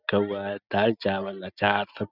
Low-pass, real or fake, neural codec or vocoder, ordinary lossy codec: 5.4 kHz; real; none; none